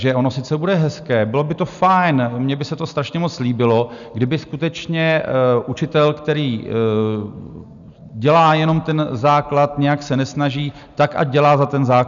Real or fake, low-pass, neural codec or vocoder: real; 7.2 kHz; none